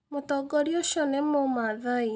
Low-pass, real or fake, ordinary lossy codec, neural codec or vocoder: none; real; none; none